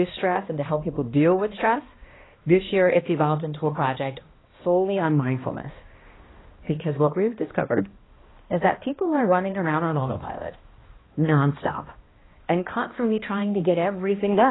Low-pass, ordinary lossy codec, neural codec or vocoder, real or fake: 7.2 kHz; AAC, 16 kbps; codec, 16 kHz, 1 kbps, X-Codec, HuBERT features, trained on balanced general audio; fake